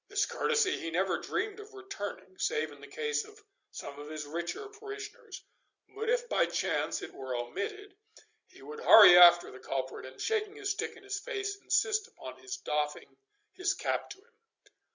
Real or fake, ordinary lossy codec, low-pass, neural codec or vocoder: real; Opus, 64 kbps; 7.2 kHz; none